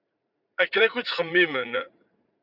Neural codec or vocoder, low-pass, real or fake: vocoder, 44.1 kHz, 80 mel bands, Vocos; 5.4 kHz; fake